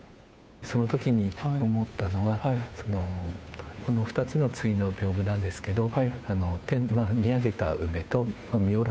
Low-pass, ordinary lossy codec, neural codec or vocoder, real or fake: none; none; codec, 16 kHz, 2 kbps, FunCodec, trained on Chinese and English, 25 frames a second; fake